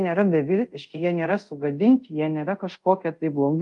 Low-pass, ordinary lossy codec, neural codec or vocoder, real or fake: 10.8 kHz; Opus, 32 kbps; codec, 24 kHz, 0.5 kbps, DualCodec; fake